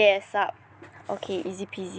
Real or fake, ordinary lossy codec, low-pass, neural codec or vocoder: real; none; none; none